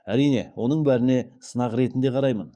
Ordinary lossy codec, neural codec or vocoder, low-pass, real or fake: none; codec, 44.1 kHz, 7.8 kbps, DAC; 9.9 kHz; fake